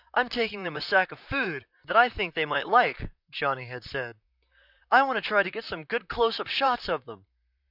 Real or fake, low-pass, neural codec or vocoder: fake; 5.4 kHz; vocoder, 44.1 kHz, 128 mel bands, Pupu-Vocoder